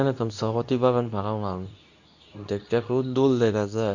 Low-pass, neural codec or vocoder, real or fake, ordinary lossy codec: 7.2 kHz; codec, 24 kHz, 0.9 kbps, WavTokenizer, medium speech release version 2; fake; none